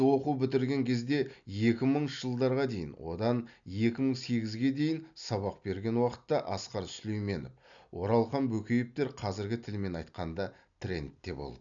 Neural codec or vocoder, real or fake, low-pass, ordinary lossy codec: none; real; 7.2 kHz; none